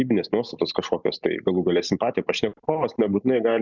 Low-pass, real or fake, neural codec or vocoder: 7.2 kHz; real; none